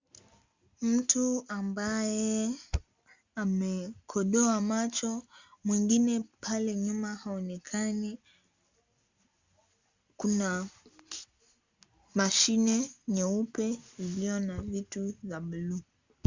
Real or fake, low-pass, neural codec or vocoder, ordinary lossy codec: real; 7.2 kHz; none; Opus, 64 kbps